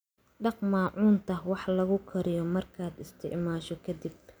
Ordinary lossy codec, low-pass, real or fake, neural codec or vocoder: none; none; real; none